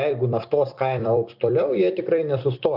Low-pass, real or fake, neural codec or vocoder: 5.4 kHz; fake; vocoder, 44.1 kHz, 128 mel bands every 256 samples, BigVGAN v2